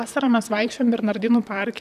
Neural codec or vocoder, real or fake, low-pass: vocoder, 44.1 kHz, 128 mel bands, Pupu-Vocoder; fake; 14.4 kHz